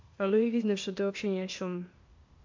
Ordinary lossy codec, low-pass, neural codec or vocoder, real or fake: MP3, 48 kbps; 7.2 kHz; codec, 16 kHz, 0.8 kbps, ZipCodec; fake